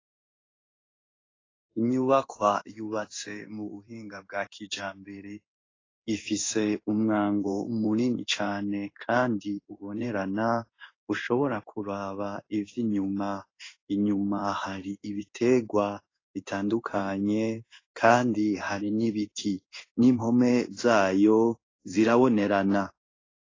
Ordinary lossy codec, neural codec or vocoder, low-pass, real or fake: AAC, 32 kbps; codec, 16 kHz in and 24 kHz out, 1 kbps, XY-Tokenizer; 7.2 kHz; fake